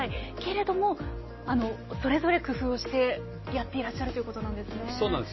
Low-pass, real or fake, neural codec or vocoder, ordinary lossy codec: 7.2 kHz; real; none; MP3, 24 kbps